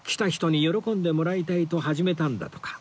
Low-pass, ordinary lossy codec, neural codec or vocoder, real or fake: none; none; none; real